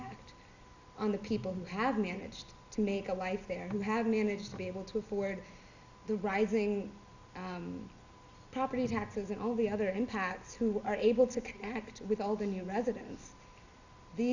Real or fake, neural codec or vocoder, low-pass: real; none; 7.2 kHz